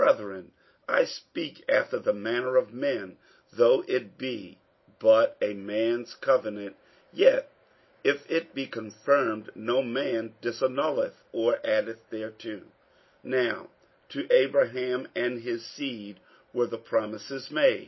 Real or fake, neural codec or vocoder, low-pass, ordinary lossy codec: real; none; 7.2 kHz; MP3, 24 kbps